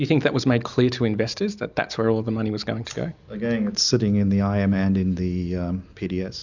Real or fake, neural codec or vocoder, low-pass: real; none; 7.2 kHz